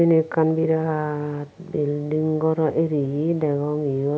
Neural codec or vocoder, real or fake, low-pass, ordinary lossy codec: none; real; none; none